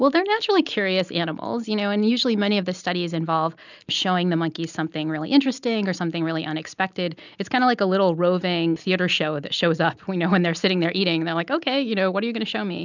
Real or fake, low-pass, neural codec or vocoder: real; 7.2 kHz; none